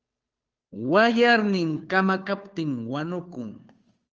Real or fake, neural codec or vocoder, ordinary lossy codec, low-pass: fake; codec, 16 kHz, 2 kbps, FunCodec, trained on Chinese and English, 25 frames a second; Opus, 24 kbps; 7.2 kHz